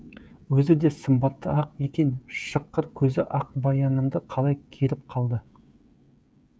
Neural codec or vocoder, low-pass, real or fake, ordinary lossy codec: codec, 16 kHz, 16 kbps, FreqCodec, smaller model; none; fake; none